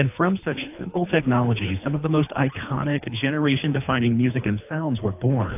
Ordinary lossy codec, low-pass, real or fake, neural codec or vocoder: AAC, 32 kbps; 3.6 kHz; fake; codec, 24 kHz, 3 kbps, HILCodec